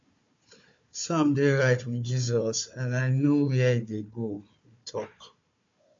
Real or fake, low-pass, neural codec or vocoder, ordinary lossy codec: fake; 7.2 kHz; codec, 16 kHz, 4 kbps, FunCodec, trained on Chinese and English, 50 frames a second; MP3, 48 kbps